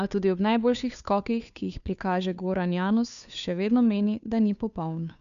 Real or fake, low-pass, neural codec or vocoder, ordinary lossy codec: fake; 7.2 kHz; codec, 16 kHz, 2 kbps, FunCodec, trained on Chinese and English, 25 frames a second; none